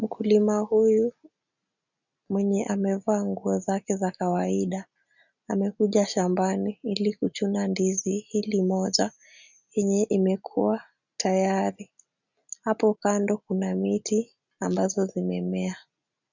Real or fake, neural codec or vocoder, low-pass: real; none; 7.2 kHz